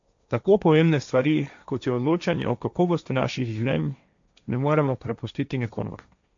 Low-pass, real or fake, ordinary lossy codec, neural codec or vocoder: 7.2 kHz; fake; AAC, 64 kbps; codec, 16 kHz, 1.1 kbps, Voila-Tokenizer